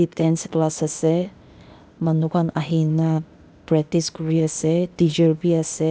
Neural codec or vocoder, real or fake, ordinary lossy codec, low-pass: codec, 16 kHz, 0.8 kbps, ZipCodec; fake; none; none